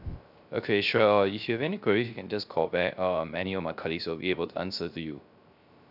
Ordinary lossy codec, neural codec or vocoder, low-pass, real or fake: none; codec, 16 kHz, 0.3 kbps, FocalCodec; 5.4 kHz; fake